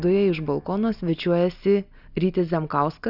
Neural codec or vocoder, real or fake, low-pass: none; real; 5.4 kHz